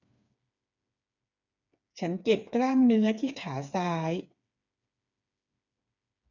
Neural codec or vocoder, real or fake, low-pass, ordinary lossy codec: codec, 16 kHz, 4 kbps, FreqCodec, smaller model; fake; 7.2 kHz; none